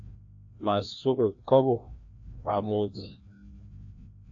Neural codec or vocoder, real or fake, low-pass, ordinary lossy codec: codec, 16 kHz, 1 kbps, FreqCodec, larger model; fake; 7.2 kHz; AAC, 48 kbps